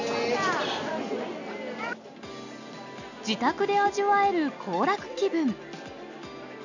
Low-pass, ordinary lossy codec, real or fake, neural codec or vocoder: 7.2 kHz; none; real; none